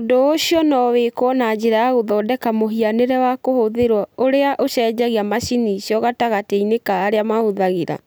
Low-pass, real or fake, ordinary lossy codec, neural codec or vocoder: none; real; none; none